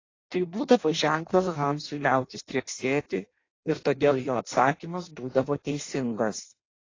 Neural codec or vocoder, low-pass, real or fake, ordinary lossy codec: codec, 16 kHz in and 24 kHz out, 0.6 kbps, FireRedTTS-2 codec; 7.2 kHz; fake; AAC, 32 kbps